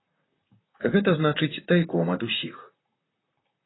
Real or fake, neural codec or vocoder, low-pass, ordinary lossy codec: fake; vocoder, 44.1 kHz, 128 mel bands, Pupu-Vocoder; 7.2 kHz; AAC, 16 kbps